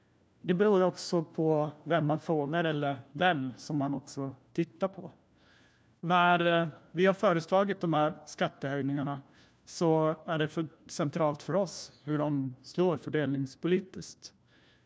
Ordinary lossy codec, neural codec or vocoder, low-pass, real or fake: none; codec, 16 kHz, 1 kbps, FunCodec, trained on LibriTTS, 50 frames a second; none; fake